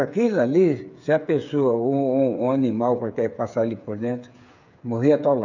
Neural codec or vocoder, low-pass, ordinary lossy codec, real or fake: codec, 16 kHz, 8 kbps, FreqCodec, smaller model; 7.2 kHz; none; fake